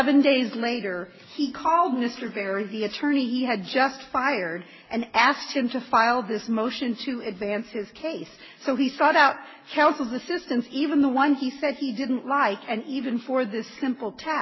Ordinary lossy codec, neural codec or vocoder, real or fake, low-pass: MP3, 24 kbps; none; real; 7.2 kHz